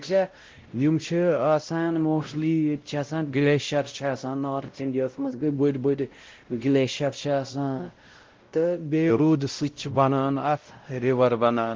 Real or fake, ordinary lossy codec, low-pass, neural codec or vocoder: fake; Opus, 16 kbps; 7.2 kHz; codec, 16 kHz, 0.5 kbps, X-Codec, WavLM features, trained on Multilingual LibriSpeech